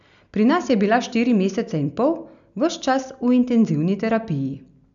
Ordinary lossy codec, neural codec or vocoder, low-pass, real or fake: none; none; 7.2 kHz; real